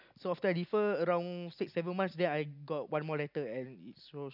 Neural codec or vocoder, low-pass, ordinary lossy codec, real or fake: none; 5.4 kHz; none; real